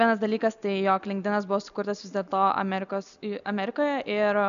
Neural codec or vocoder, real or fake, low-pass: none; real; 7.2 kHz